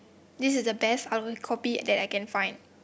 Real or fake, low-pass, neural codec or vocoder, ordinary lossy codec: real; none; none; none